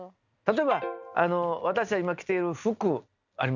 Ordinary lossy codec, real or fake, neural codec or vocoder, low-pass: none; real; none; 7.2 kHz